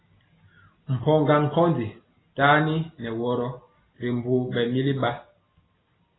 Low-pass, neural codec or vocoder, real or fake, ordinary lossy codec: 7.2 kHz; none; real; AAC, 16 kbps